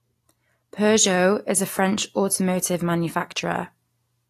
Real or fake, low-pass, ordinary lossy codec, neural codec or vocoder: real; 14.4 kHz; AAC, 48 kbps; none